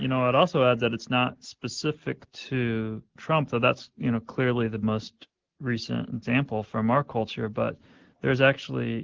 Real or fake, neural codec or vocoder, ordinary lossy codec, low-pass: real; none; Opus, 16 kbps; 7.2 kHz